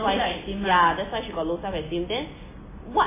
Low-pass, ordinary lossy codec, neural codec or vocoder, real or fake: 3.6 kHz; MP3, 16 kbps; none; real